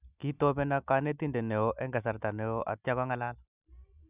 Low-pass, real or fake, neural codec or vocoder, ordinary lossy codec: 3.6 kHz; real; none; none